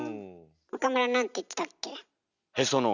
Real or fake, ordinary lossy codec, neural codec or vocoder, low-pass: real; none; none; 7.2 kHz